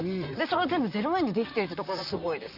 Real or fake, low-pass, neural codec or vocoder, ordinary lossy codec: fake; 5.4 kHz; vocoder, 44.1 kHz, 128 mel bands, Pupu-Vocoder; Opus, 64 kbps